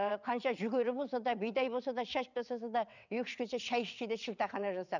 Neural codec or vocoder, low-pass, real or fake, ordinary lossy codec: vocoder, 22.05 kHz, 80 mel bands, WaveNeXt; 7.2 kHz; fake; none